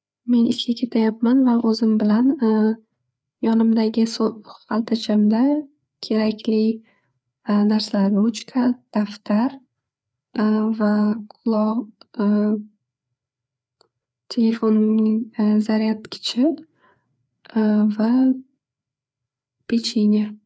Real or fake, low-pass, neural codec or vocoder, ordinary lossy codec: fake; none; codec, 16 kHz, 4 kbps, FreqCodec, larger model; none